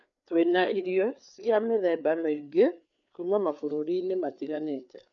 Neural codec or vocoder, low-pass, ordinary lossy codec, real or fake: codec, 16 kHz, 4 kbps, FreqCodec, larger model; 7.2 kHz; MP3, 64 kbps; fake